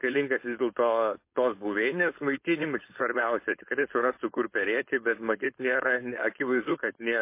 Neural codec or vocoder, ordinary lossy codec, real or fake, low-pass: codec, 16 kHz, 4.8 kbps, FACodec; MP3, 24 kbps; fake; 3.6 kHz